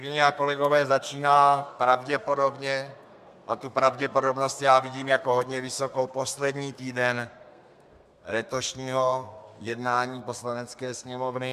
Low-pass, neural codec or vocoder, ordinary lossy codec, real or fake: 14.4 kHz; codec, 32 kHz, 1.9 kbps, SNAC; MP3, 96 kbps; fake